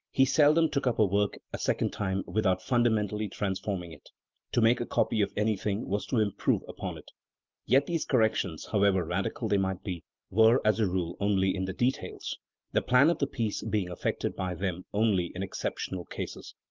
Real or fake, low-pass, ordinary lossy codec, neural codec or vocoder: real; 7.2 kHz; Opus, 24 kbps; none